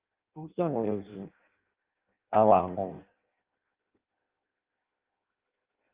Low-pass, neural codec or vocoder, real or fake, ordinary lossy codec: 3.6 kHz; codec, 16 kHz in and 24 kHz out, 0.6 kbps, FireRedTTS-2 codec; fake; Opus, 32 kbps